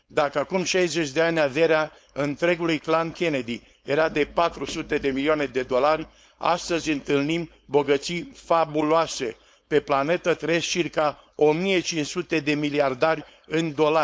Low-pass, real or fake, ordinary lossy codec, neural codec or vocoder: none; fake; none; codec, 16 kHz, 4.8 kbps, FACodec